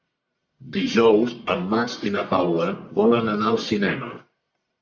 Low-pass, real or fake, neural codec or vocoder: 7.2 kHz; fake; codec, 44.1 kHz, 1.7 kbps, Pupu-Codec